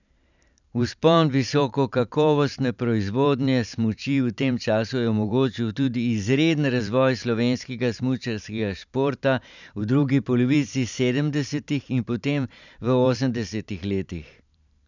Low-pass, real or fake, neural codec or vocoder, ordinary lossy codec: 7.2 kHz; fake; vocoder, 44.1 kHz, 128 mel bands every 512 samples, BigVGAN v2; none